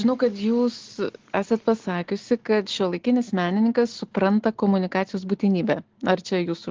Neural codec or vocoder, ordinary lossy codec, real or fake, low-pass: none; Opus, 16 kbps; real; 7.2 kHz